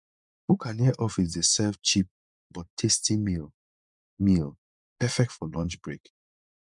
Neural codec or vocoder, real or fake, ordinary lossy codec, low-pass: vocoder, 24 kHz, 100 mel bands, Vocos; fake; none; 10.8 kHz